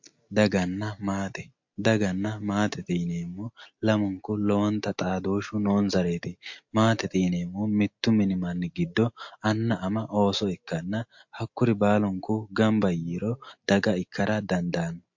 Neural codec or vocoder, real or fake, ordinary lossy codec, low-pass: none; real; MP3, 48 kbps; 7.2 kHz